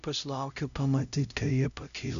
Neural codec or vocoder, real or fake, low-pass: codec, 16 kHz, 0.5 kbps, X-Codec, HuBERT features, trained on LibriSpeech; fake; 7.2 kHz